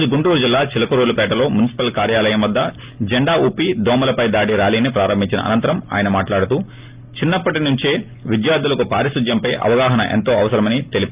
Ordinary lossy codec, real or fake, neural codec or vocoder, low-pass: Opus, 24 kbps; real; none; 3.6 kHz